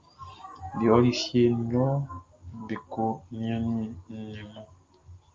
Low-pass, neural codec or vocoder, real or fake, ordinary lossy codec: 7.2 kHz; none; real; Opus, 32 kbps